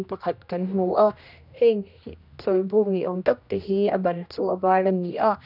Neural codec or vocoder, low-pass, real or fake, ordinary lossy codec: codec, 16 kHz, 1 kbps, X-Codec, HuBERT features, trained on general audio; 5.4 kHz; fake; none